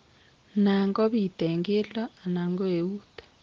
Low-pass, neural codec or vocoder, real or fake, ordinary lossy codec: 7.2 kHz; none; real; Opus, 16 kbps